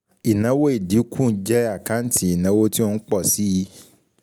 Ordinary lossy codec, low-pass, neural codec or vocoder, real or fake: none; none; none; real